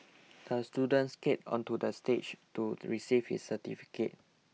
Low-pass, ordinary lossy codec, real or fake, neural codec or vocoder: none; none; real; none